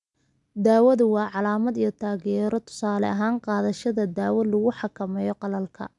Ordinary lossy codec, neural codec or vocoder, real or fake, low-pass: none; none; real; 10.8 kHz